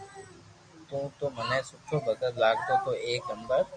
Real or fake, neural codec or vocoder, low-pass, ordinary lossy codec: real; none; 9.9 kHz; MP3, 96 kbps